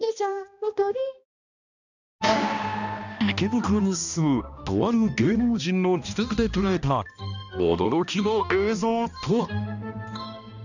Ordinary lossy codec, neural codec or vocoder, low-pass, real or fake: none; codec, 16 kHz, 1 kbps, X-Codec, HuBERT features, trained on balanced general audio; 7.2 kHz; fake